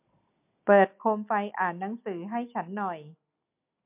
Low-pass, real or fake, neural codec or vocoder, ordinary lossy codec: 3.6 kHz; real; none; MP3, 32 kbps